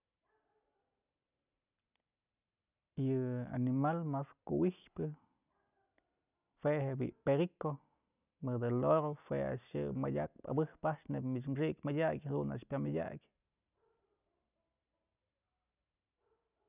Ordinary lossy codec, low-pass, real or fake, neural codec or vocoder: none; 3.6 kHz; real; none